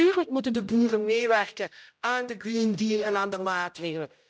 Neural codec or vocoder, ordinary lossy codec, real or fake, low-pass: codec, 16 kHz, 0.5 kbps, X-Codec, HuBERT features, trained on general audio; none; fake; none